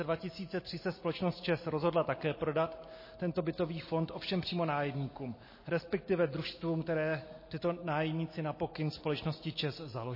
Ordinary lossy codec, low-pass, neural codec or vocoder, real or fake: MP3, 24 kbps; 5.4 kHz; none; real